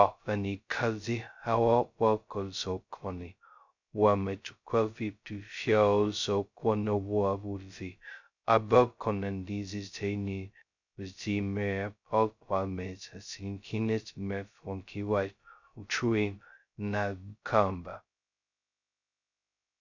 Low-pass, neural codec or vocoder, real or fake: 7.2 kHz; codec, 16 kHz, 0.2 kbps, FocalCodec; fake